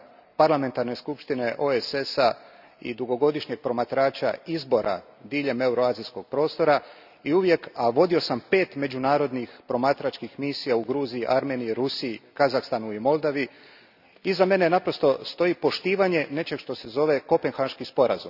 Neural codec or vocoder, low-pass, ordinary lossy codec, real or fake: none; 5.4 kHz; none; real